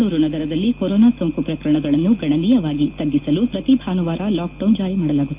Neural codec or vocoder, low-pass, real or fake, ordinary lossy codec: none; 3.6 kHz; real; Opus, 24 kbps